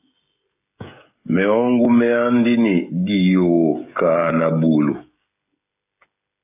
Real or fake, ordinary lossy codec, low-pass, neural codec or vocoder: fake; AAC, 32 kbps; 3.6 kHz; codec, 16 kHz, 16 kbps, FreqCodec, smaller model